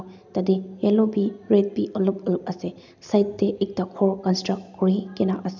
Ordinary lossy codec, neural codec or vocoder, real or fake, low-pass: none; none; real; 7.2 kHz